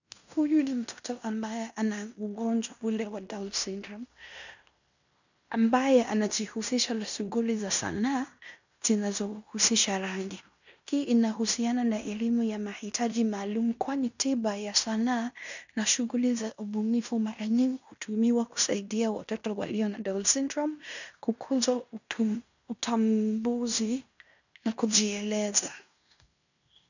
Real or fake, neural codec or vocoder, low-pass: fake; codec, 16 kHz in and 24 kHz out, 0.9 kbps, LongCat-Audio-Codec, fine tuned four codebook decoder; 7.2 kHz